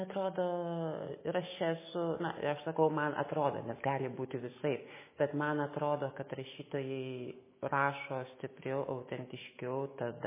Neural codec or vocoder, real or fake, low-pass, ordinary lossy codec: autoencoder, 48 kHz, 128 numbers a frame, DAC-VAE, trained on Japanese speech; fake; 3.6 kHz; MP3, 16 kbps